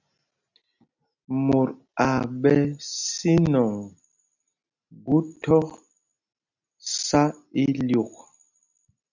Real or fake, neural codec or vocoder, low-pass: real; none; 7.2 kHz